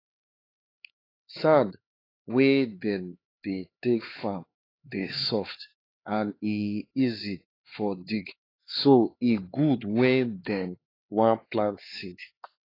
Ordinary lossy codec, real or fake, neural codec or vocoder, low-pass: AAC, 24 kbps; fake; codec, 16 kHz, 4 kbps, X-Codec, HuBERT features, trained on LibriSpeech; 5.4 kHz